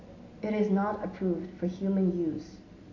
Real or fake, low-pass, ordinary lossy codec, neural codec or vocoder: real; 7.2 kHz; AAC, 48 kbps; none